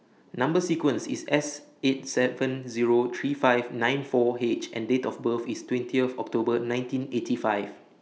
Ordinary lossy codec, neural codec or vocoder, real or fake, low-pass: none; none; real; none